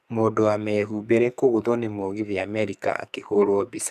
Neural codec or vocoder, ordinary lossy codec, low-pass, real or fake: codec, 44.1 kHz, 2.6 kbps, SNAC; none; 14.4 kHz; fake